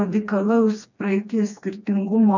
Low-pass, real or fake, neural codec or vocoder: 7.2 kHz; fake; codec, 16 kHz, 2 kbps, FreqCodec, smaller model